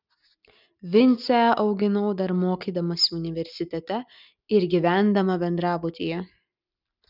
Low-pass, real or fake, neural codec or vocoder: 5.4 kHz; real; none